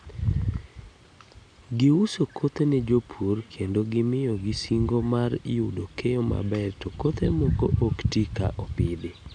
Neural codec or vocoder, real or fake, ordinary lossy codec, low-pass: none; real; none; 9.9 kHz